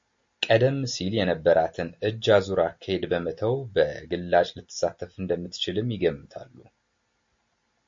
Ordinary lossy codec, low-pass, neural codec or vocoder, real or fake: MP3, 48 kbps; 7.2 kHz; none; real